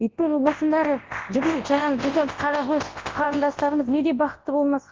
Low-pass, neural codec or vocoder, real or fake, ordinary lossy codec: 7.2 kHz; codec, 24 kHz, 0.9 kbps, WavTokenizer, large speech release; fake; Opus, 16 kbps